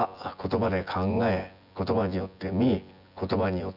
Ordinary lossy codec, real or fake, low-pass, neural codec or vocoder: none; fake; 5.4 kHz; vocoder, 24 kHz, 100 mel bands, Vocos